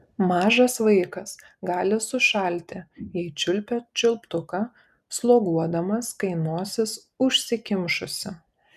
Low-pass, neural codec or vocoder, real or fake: 14.4 kHz; none; real